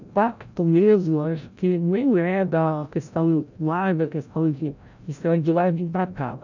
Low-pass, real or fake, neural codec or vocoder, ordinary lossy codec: 7.2 kHz; fake; codec, 16 kHz, 0.5 kbps, FreqCodec, larger model; none